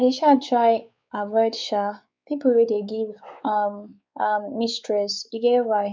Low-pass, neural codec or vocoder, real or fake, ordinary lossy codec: none; codec, 16 kHz, 4 kbps, X-Codec, WavLM features, trained on Multilingual LibriSpeech; fake; none